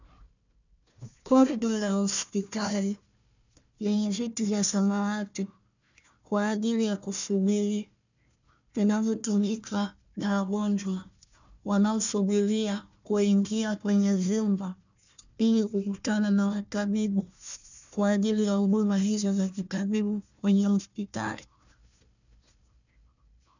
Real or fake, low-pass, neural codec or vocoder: fake; 7.2 kHz; codec, 16 kHz, 1 kbps, FunCodec, trained on Chinese and English, 50 frames a second